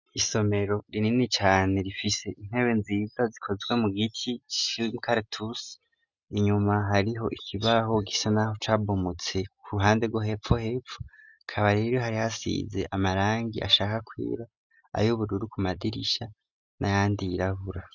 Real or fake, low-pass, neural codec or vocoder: real; 7.2 kHz; none